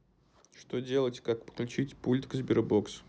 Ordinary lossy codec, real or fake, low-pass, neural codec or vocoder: none; real; none; none